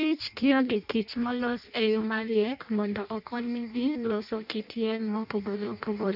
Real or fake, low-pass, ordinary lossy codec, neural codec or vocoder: fake; 5.4 kHz; none; codec, 16 kHz in and 24 kHz out, 0.6 kbps, FireRedTTS-2 codec